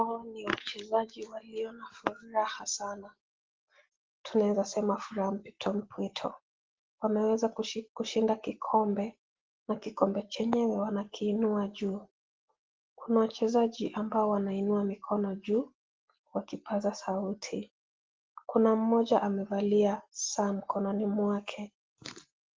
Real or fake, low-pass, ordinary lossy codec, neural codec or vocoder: real; 7.2 kHz; Opus, 16 kbps; none